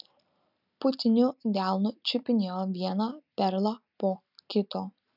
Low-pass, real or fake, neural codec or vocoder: 5.4 kHz; real; none